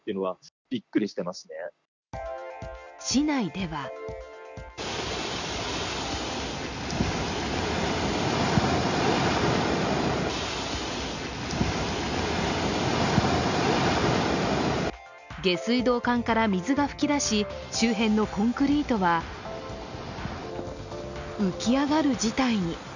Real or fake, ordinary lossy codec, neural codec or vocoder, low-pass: real; none; none; 7.2 kHz